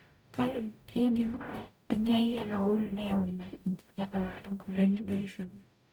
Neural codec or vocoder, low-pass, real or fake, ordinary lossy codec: codec, 44.1 kHz, 0.9 kbps, DAC; none; fake; none